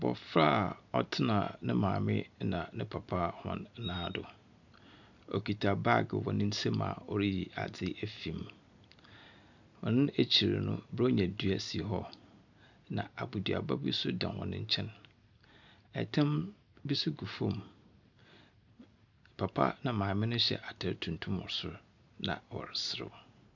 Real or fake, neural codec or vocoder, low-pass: real; none; 7.2 kHz